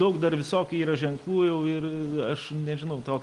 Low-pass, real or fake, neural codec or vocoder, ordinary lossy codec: 10.8 kHz; real; none; Opus, 24 kbps